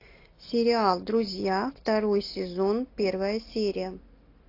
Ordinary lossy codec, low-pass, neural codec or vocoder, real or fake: AAC, 32 kbps; 5.4 kHz; none; real